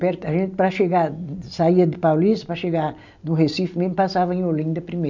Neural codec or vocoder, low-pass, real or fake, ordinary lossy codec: none; 7.2 kHz; real; none